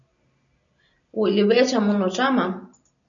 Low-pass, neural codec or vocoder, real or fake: 7.2 kHz; none; real